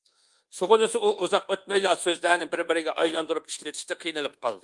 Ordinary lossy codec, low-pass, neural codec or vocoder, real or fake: Opus, 24 kbps; 10.8 kHz; codec, 24 kHz, 1.2 kbps, DualCodec; fake